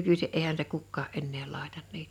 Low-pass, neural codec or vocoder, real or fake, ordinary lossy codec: 19.8 kHz; none; real; none